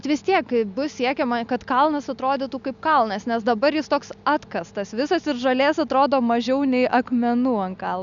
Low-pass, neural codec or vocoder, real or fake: 7.2 kHz; none; real